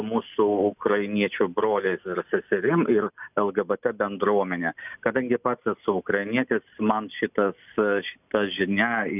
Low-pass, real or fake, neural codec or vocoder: 3.6 kHz; real; none